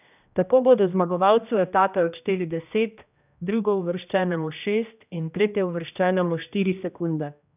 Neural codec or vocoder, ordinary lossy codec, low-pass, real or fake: codec, 16 kHz, 1 kbps, X-Codec, HuBERT features, trained on general audio; none; 3.6 kHz; fake